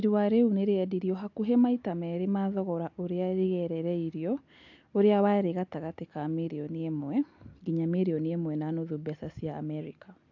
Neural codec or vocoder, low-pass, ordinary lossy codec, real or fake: none; 7.2 kHz; AAC, 48 kbps; real